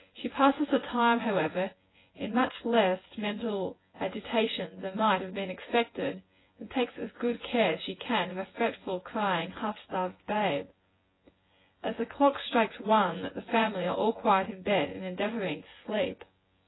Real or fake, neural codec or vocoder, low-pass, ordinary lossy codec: fake; vocoder, 24 kHz, 100 mel bands, Vocos; 7.2 kHz; AAC, 16 kbps